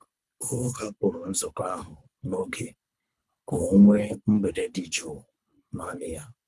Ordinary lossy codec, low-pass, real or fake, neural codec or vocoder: none; none; fake; codec, 24 kHz, 3 kbps, HILCodec